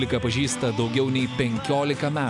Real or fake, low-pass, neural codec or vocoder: real; 10.8 kHz; none